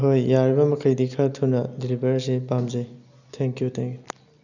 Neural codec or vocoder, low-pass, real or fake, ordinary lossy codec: none; 7.2 kHz; real; none